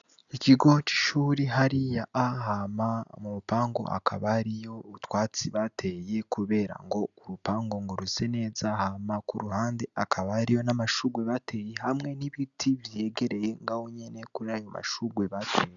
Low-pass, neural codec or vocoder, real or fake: 7.2 kHz; none; real